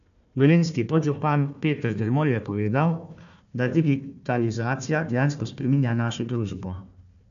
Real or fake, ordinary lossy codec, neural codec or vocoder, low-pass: fake; AAC, 96 kbps; codec, 16 kHz, 1 kbps, FunCodec, trained on Chinese and English, 50 frames a second; 7.2 kHz